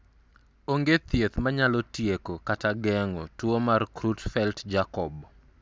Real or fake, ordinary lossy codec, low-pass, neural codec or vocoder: real; none; none; none